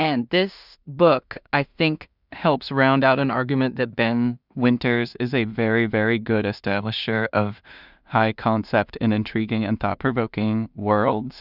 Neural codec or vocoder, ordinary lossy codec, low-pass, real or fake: codec, 16 kHz in and 24 kHz out, 0.4 kbps, LongCat-Audio-Codec, two codebook decoder; Opus, 64 kbps; 5.4 kHz; fake